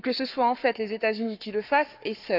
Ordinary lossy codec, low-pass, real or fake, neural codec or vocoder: none; 5.4 kHz; fake; codec, 44.1 kHz, 7.8 kbps, Pupu-Codec